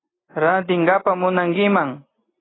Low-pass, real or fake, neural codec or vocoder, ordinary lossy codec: 7.2 kHz; real; none; AAC, 16 kbps